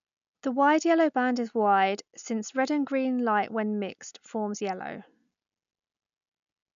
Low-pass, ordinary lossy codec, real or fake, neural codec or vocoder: 7.2 kHz; none; real; none